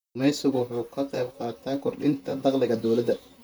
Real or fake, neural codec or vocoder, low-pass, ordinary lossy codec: fake; vocoder, 44.1 kHz, 128 mel bands, Pupu-Vocoder; none; none